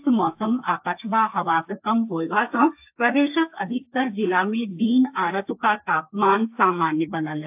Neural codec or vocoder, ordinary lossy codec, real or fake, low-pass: codec, 32 kHz, 1.9 kbps, SNAC; AAC, 32 kbps; fake; 3.6 kHz